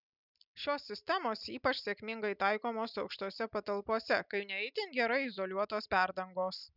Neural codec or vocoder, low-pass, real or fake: none; 5.4 kHz; real